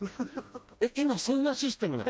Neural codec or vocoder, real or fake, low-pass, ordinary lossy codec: codec, 16 kHz, 1 kbps, FreqCodec, smaller model; fake; none; none